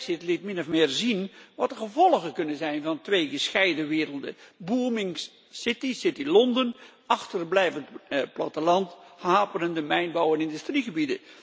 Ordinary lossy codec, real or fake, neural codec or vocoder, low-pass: none; real; none; none